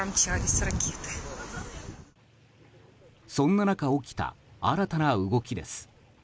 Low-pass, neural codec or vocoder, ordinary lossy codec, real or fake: none; none; none; real